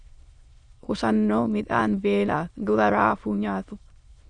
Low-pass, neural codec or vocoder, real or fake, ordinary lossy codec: 9.9 kHz; autoencoder, 22.05 kHz, a latent of 192 numbers a frame, VITS, trained on many speakers; fake; Opus, 64 kbps